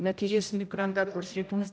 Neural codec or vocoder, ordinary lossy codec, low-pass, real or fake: codec, 16 kHz, 0.5 kbps, X-Codec, HuBERT features, trained on general audio; none; none; fake